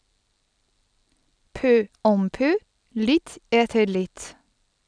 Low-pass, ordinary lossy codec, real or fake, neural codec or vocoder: 9.9 kHz; none; real; none